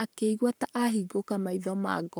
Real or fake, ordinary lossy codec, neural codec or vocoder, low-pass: fake; none; codec, 44.1 kHz, 7.8 kbps, Pupu-Codec; none